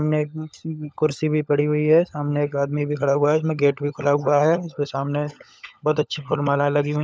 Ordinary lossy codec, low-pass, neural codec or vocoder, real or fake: none; none; codec, 16 kHz, 16 kbps, FunCodec, trained on LibriTTS, 50 frames a second; fake